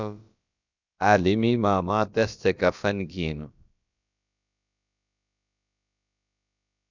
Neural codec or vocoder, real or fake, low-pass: codec, 16 kHz, about 1 kbps, DyCAST, with the encoder's durations; fake; 7.2 kHz